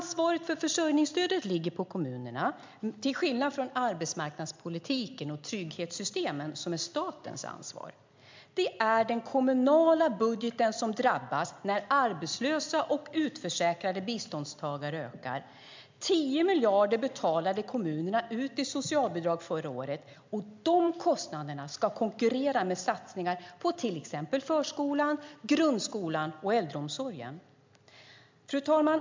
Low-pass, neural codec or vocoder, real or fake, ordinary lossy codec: 7.2 kHz; none; real; MP3, 64 kbps